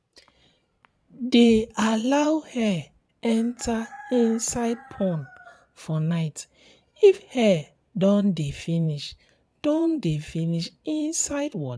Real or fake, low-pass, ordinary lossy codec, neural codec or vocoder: fake; none; none; vocoder, 22.05 kHz, 80 mel bands, Vocos